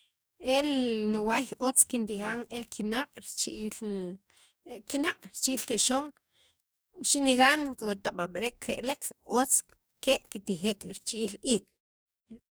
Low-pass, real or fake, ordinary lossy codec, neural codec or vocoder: none; fake; none; codec, 44.1 kHz, 2.6 kbps, DAC